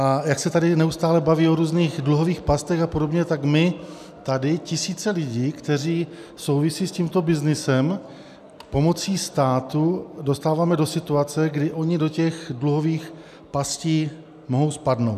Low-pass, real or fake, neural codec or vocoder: 14.4 kHz; real; none